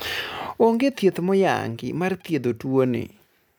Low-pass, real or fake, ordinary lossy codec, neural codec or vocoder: none; real; none; none